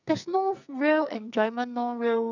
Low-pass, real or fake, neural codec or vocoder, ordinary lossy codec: 7.2 kHz; fake; codec, 44.1 kHz, 2.6 kbps, SNAC; none